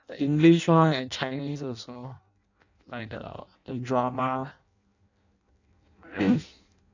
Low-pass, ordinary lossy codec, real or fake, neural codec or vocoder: 7.2 kHz; none; fake; codec, 16 kHz in and 24 kHz out, 0.6 kbps, FireRedTTS-2 codec